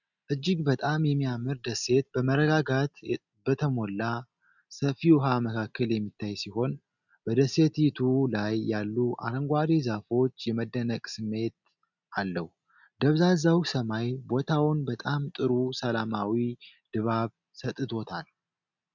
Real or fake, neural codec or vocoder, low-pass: real; none; 7.2 kHz